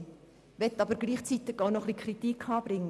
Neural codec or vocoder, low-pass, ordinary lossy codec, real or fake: none; none; none; real